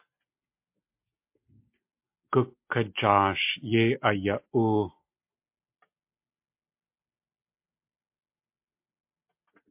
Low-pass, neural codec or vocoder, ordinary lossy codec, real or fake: 3.6 kHz; none; MP3, 32 kbps; real